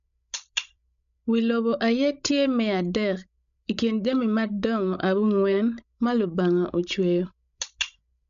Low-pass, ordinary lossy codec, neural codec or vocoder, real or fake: 7.2 kHz; none; codec, 16 kHz, 16 kbps, FreqCodec, larger model; fake